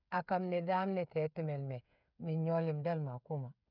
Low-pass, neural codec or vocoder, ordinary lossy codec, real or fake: 5.4 kHz; codec, 16 kHz, 8 kbps, FreqCodec, smaller model; none; fake